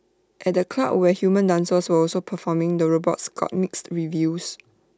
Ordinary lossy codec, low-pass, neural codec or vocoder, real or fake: none; none; none; real